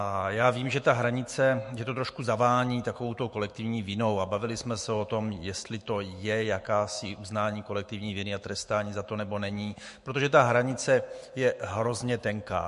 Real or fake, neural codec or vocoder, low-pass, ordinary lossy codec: fake; autoencoder, 48 kHz, 128 numbers a frame, DAC-VAE, trained on Japanese speech; 14.4 kHz; MP3, 48 kbps